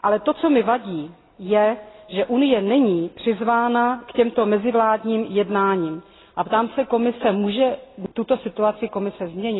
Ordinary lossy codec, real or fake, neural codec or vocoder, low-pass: AAC, 16 kbps; real; none; 7.2 kHz